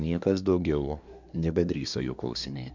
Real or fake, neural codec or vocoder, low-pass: fake; codec, 24 kHz, 1 kbps, SNAC; 7.2 kHz